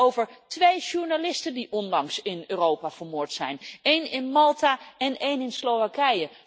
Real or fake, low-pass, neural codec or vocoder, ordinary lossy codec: real; none; none; none